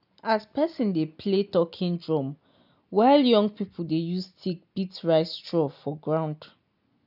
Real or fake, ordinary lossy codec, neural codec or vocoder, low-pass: real; none; none; 5.4 kHz